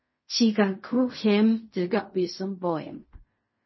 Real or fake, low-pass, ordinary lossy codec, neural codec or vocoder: fake; 7.2 kHz; MP3, 24 kbps; codec, 16 kHz in and 24 kHz out, 0.4 kbps, LongCat-Audio-Codec, fine tuned four codebook decoder